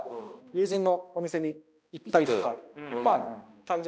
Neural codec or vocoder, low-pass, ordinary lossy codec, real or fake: codec, 16 kHz, 1 kbps, X-Codec, HuBERT features, trained on balanced general audio; none; none; fake